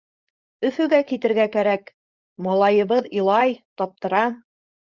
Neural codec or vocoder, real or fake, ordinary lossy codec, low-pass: codec, 16 kHz, 4.8 kbps, FACodec; fake; Opus, 64 kbps; 7.2 kHz